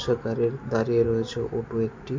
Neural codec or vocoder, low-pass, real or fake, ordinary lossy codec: none; 7.2 kHz; real; MP3, 48 kbps